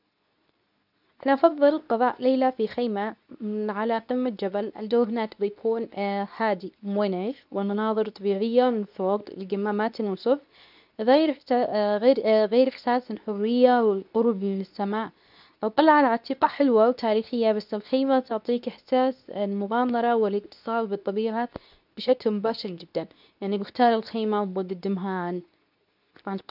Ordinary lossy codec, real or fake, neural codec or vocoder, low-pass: none; fake; codec, 24 kHz, 0.9 kbps, WavTokenizer, medium speech release version 2; 5.4 kHz